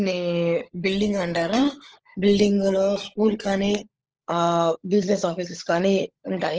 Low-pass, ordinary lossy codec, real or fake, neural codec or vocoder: 7.2 kHz; Opus, 16 kbps; fake; codec, 16 kHz, 16 kbps, FunCodec, trained on LibriTTS, 50 frames a second